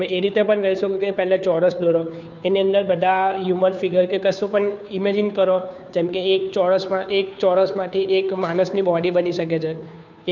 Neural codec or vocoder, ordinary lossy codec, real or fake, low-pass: codec, 16 kHz, 2 kbps, FunCodec, trained on Chinese and English, 25 frames a second; none; fake; 7.2 kHz